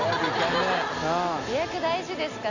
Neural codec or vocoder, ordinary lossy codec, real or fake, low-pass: none; none; real; 7.2 kHz